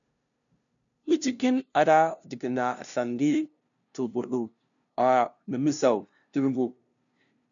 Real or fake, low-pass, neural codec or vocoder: fake; 7.2 kHz; codec, 16 kHz, 0.5 kbps, FunCodec, trained on LibriTTS, 25 frames a second